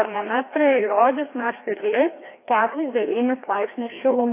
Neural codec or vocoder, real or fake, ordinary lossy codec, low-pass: codec, 16 kHz, 1 kbps, FreqCodec, larger model; fake; AAC, 24 kbps; 3.6 kHz